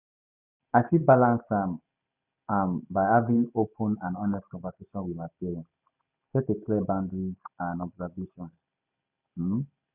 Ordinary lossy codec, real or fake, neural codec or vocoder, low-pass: none; real; none; 3.6 kHz